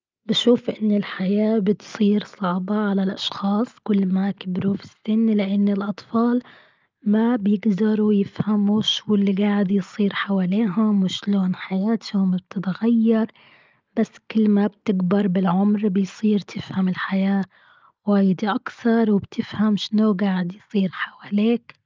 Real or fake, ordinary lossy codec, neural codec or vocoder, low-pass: real; Opus, 24 kbps; none; 7.2 kHz